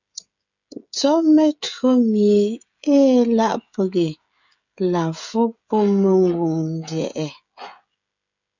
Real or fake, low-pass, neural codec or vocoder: fake; 7.2 kHz; codec, 16 kHz, 16 kbps, FreqCodec, smaller model